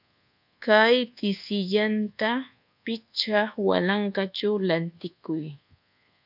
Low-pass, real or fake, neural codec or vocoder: 5.4 kHz; fake; codec, 24 kHz, 1.2 kbps, DualCodec